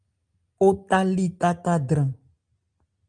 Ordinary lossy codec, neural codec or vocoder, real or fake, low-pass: Opus, 32 kbps; none; real; 9.9 kHz